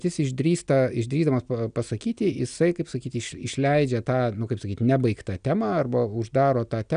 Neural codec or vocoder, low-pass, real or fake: none; 9.9 kHz; real